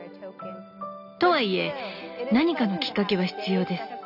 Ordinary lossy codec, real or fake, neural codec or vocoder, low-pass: none; real; none; 5.4 kHz